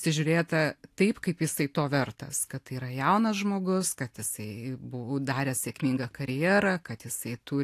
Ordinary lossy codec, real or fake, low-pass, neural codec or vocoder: AAC, 64 kbps; real; 14.4 kHz; none